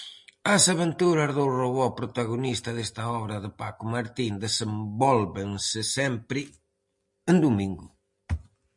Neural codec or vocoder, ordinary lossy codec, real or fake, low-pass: none; MP3, 48 kbps; real; 10.8 kHz